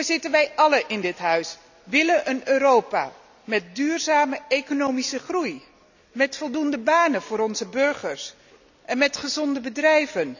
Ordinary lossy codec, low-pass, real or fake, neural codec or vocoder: none; 7.2 kHz; real; none